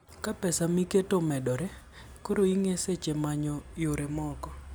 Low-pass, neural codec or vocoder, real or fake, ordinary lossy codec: none; none; real; none